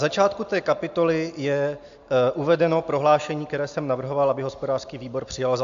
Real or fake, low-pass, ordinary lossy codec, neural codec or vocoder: real; 7.2 kHz; AAC, 64 kbps; none